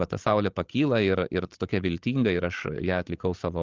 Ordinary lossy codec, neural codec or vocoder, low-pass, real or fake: Opus, 32 kbps; codec, 16 kHz, 16 kbps, FreqCodec, larger model; 7.2 kHz; fake